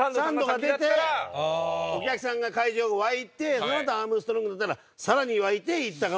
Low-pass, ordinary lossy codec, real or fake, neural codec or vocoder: none; none; real; none